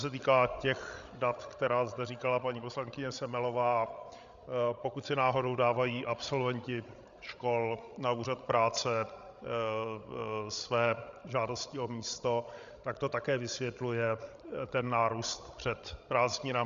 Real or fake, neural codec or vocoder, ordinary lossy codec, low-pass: fake; codec, 16 kHz, 16 kbps, FreqCodec, larger model; Opus, 64 kbps; 7.2 kHz